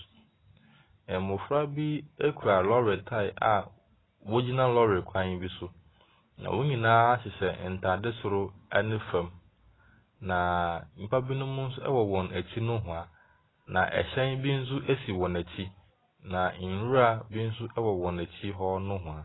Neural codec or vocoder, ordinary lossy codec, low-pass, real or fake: none; AAC, 16 kbps; 7.2 kHz; real